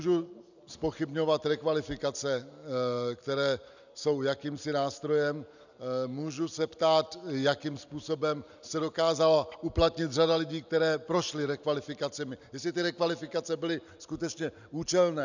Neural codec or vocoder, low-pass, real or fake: none; 7.2 kHz; real